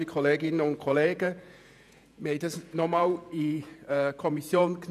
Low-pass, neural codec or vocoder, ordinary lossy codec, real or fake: 14.4 kHz; vocoder, 48 kHz, 128 mel bands, Vocos; none; fake